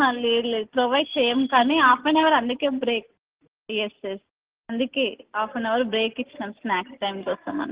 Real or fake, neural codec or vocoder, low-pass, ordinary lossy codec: real; none; 3.6 kHz; Opus, 16 kbps